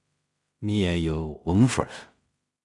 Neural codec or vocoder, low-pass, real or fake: codec, 16 kHz in and 24 kHz out, 0.4 kbps, LongCat-Audio-Codec, two codebook decoder; 10.8 kHz; fake